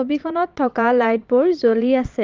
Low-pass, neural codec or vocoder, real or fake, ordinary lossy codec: 7.2 kHz; vocoder, 22.05 kHz, 80 mel bands, Vocos; fake; Opus, 32 kbps